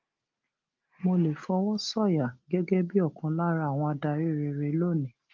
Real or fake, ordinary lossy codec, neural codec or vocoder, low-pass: real; Opus, 32 kbps; none; 7.2 kHz